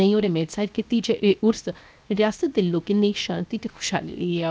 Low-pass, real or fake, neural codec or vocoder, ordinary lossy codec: none; fake; codec, 16 kHz, 0.7 kbps, FocalCodec; none